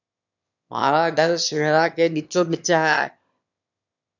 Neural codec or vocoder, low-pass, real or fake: autoencoder, 22.05 kHz, a latent of 192 numbers a frame, VITS, trained on one speaker; 7.2 kHz; fake